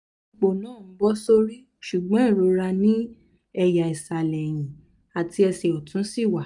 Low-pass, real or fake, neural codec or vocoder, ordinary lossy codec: 10.8 kHz; real; none; none